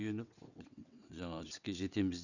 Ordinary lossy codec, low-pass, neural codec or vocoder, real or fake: none; 7.2 kHz; vocoder, 22.05 kHz, 80 mel bands, WaveNeXt; fake